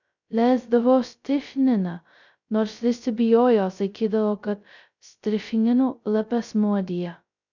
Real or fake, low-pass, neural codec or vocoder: fake; 7.2 kHz; codec, 16 kHz, 0.2 kbps, FocalCodec